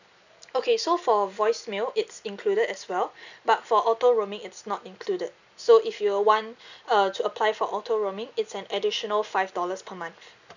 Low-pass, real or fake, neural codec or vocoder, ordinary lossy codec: 7.2 kHz; real; none; none